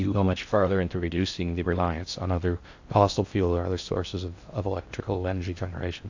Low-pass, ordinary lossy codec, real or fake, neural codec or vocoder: 7.2 kHz; AAC, 48 kbps; fake; codec, 16 kHz in and 24 kHz out, 0.6 kbps, FocalCodec, streaming, 4096 codes